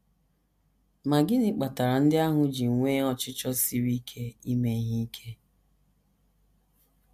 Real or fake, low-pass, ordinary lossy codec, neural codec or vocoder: real; 14.4 kHz; AAC, 96 kbps; none